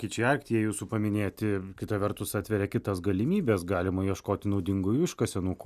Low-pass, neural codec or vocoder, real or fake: 14.4 kHz; none; real